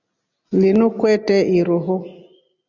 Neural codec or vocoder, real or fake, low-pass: none; real; 7.2 kHz